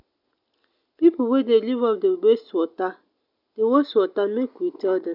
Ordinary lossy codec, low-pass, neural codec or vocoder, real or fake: none; 5.4 kHz; none; real